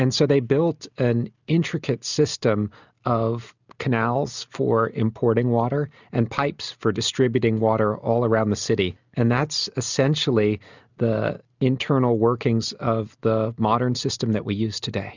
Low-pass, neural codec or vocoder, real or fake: 7.2 kHz; none; real